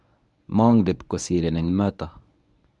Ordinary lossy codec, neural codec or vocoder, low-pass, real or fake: MP3, 96 kbps; codec, 24 kHz, 0.9 kbps, WavTokenizer, medium speech release version 1; 10.8 kHz; fake